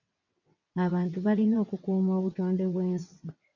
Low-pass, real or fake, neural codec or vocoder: 7.2 kHz; fake; vocoder, 22.05 kHz, 80 mel bands, WaveNeXt